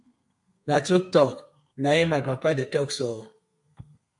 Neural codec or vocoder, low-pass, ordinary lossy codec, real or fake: codec, 32 kHz, 1.9 kbps, SNAC; 10.8 kHz; MP3, 64 kbps; fake